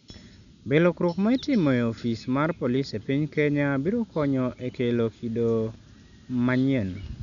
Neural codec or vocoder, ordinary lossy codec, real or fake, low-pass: none; none; real; 7.2 kHz